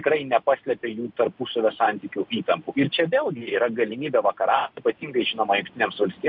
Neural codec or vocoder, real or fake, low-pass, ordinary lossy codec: none; real; 5.4 kHz; AAC, 48 kbps